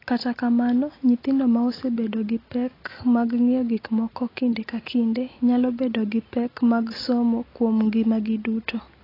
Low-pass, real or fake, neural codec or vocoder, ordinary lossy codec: 5.4 kHz; real; none; AAC, 24 kbps